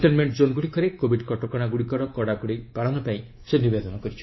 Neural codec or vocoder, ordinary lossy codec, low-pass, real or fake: codec, 24 kHz, 3.1 kbps, DualCodec; MP3, 24 kbps; 7.2 kHz; fake